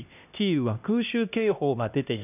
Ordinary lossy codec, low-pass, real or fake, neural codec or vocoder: none; 3.6 kHz; fake; codec, 16 kHz, 1 kbps, X-Codec, HuBERT features, trained on LibriSpeech